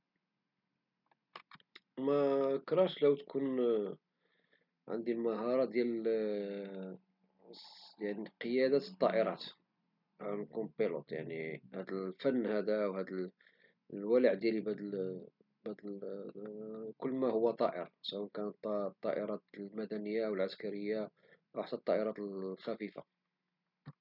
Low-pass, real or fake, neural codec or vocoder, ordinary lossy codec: 5.4 kHz; real; none; none